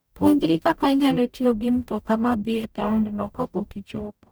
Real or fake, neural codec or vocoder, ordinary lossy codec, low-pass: fake; codec, 44.1 kHz, 0.9 kbps, DAC; none; none